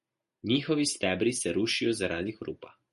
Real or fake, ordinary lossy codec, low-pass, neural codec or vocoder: real; MP3, 48 kbps; 14.4 kHz; none